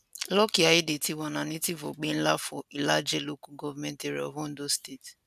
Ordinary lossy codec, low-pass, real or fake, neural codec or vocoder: none; 14.4 kHz; real; none